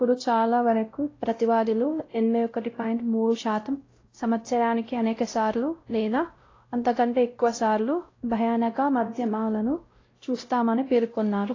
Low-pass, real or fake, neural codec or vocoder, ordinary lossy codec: 7.2 kHz; fake; codec, 16 kHz, 0.5 kbps, X-Codec, WavLM features, trained on Multilingual LibriSpeech; AAC, 32 kbps